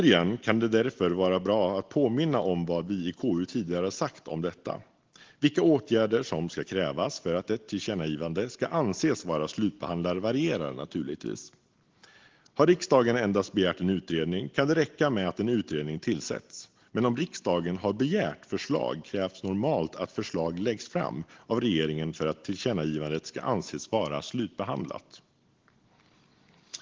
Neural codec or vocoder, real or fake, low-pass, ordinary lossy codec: none; real; 7.2 kHz; Opus, 16 kbps